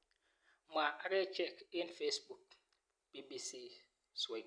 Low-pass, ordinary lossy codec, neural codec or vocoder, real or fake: 9.9 kHz; none; none; real